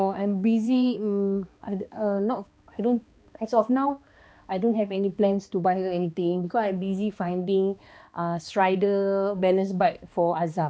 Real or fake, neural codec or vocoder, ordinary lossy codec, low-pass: fake; codec, 16 kHz, 2 kbps, X-Codec, HuBERT features, trained on balanced general audio; none; none